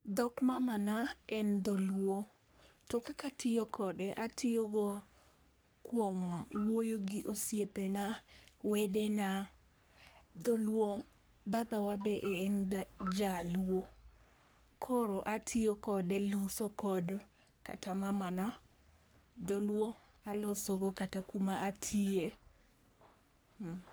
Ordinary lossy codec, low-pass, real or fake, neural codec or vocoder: none; none; fake; codec, 44.1 kHz, 3.4 kbps, Pupu-Codec